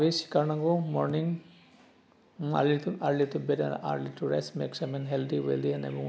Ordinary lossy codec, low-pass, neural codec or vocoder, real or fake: none; none; none; real